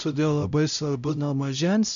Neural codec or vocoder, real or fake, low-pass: codec, 16 kHz, 0.5 kbps, X-Codec, HuBERT features, trained on LibriSpeech; fake; 7.2 kHz